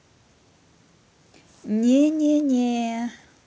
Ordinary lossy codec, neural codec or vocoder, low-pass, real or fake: none; none; none; real